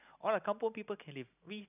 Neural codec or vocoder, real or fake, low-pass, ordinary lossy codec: none; real; 3.6 kHz; none